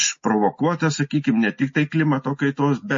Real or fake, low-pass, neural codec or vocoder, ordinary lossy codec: real; 7.2 kHz; none; MP3, 32 kbps